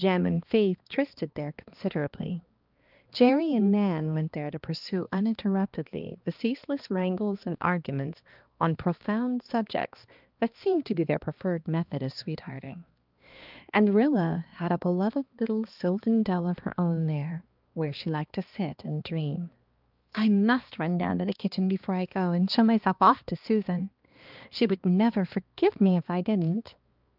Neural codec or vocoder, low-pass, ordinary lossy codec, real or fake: codec, 16 kHz, 2 kbps, X-Codec, HuBERT features, trained on balanced general audio; 5.4 kHz; Opus, 24 kbps; fake